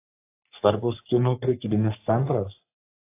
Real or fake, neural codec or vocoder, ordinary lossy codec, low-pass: fake; codec, 44.1 kHz, 3.4 kbps, Pupu-Codec; AAC, 24 kbps; 3.6 kHz